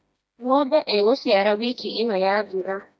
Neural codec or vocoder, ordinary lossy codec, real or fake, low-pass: codec, 16 kHz, 1 kbps, FreqCodec, smaller model; none; fake; none